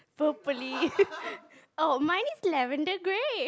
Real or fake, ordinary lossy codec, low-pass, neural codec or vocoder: real; none; none; none